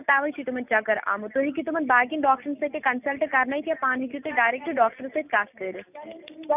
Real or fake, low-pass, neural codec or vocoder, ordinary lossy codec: real; 3.6 kHz; none; none